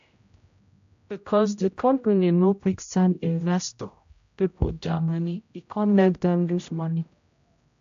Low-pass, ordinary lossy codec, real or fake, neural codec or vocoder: 7.2 kHz; AAC, 64 kbps; fake; codec, 16 kHz, 0.5 kbps, X-Codec, HuBERT features, trained on general audio